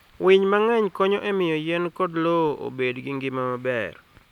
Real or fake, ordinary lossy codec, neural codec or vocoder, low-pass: real; none; none; 19.8 kHz